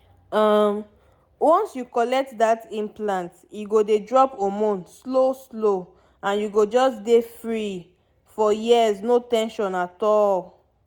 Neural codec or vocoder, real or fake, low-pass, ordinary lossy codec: none; real; 19.8 kHz; none